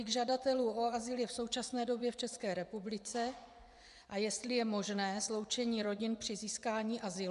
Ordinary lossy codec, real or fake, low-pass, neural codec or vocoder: MP3, 96 kbps; fake; 10.8 kHz; vocoder, 24 kHz, 100 mel bands, Vocos